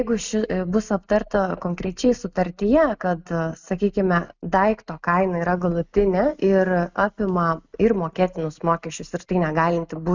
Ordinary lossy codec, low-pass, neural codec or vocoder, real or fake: Opus, 64 kbps; 7.2 kHz; none; real